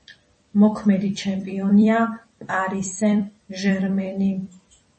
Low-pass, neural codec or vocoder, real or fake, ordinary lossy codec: 10.8 kHz; vocoder, 44.1 kHz, 128 mel bands every 256 samples, BigVGAN v2; fake; MP3, 32 kbps